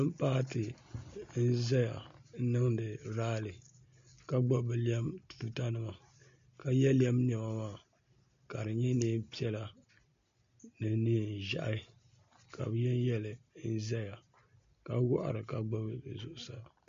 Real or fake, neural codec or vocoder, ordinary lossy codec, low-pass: real; none; MP3, 48 kbps; 7.2 kHz